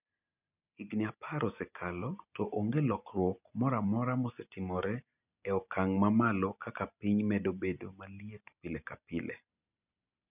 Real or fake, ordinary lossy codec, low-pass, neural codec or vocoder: real; none; 3.6 kHz; none